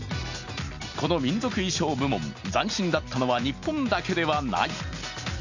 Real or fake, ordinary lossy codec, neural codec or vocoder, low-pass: real; none; none; 7.2 kHz